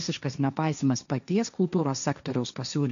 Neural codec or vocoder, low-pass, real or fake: codec, 16 kHz, 1.1 kbps, Voila-Tokenizer; 7.2 kHz; fake